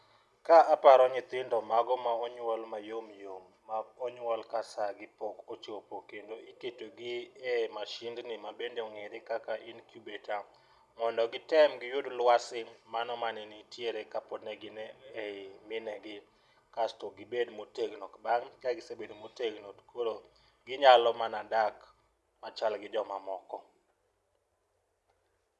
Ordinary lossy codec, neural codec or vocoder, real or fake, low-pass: none; none; real; none